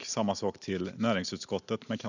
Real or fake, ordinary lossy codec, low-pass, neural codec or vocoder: real; none; 7.2 kHz; none